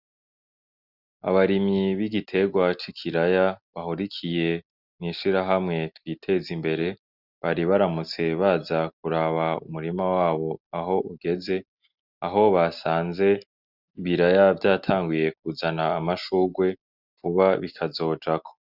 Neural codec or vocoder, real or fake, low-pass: none; real; 5.4 kHz